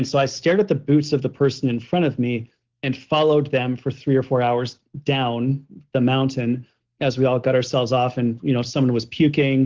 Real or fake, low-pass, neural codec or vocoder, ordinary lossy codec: real; 7.2 kHz; none; Opus, 16 kbps